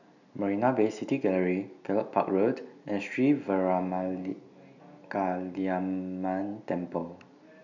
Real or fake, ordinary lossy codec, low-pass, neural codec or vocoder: real; none; 7.2 kHz; none